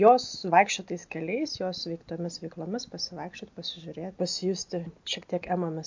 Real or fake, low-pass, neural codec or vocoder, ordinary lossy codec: real; 7.2 kHz; none; MP3, 48 kbps